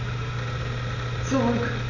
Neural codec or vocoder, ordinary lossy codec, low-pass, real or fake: none; MP3, 64 kbps; 7.2 kHz; real